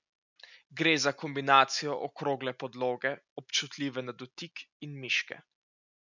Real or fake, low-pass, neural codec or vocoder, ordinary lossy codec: real; 7.2 kHz; none; none